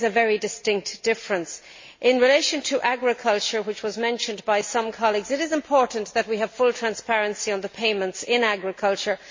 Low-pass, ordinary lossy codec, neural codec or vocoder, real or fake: 7.2 kHz; MP3, 32 kbps; none; real